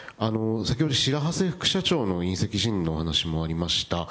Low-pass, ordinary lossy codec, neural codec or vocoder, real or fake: none; none; none; real